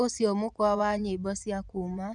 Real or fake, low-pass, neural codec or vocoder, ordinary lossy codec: fake; 10.8 kHz; vocoder, 24 kHz, 100 mel bands, Vocos; none